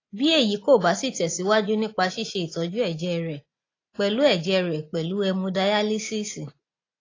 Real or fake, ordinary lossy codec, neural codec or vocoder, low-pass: real; AAC, 32 kbps; none; 7.2 kHz